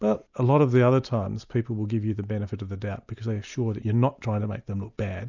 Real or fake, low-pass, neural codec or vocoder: real; 7.2 kHz; none